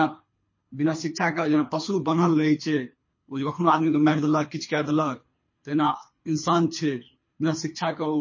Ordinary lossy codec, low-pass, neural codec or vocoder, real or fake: MP3, 32 kbps; 7.2 kHz; codec, 24 kHz, 3 kbps, HILCodec; fake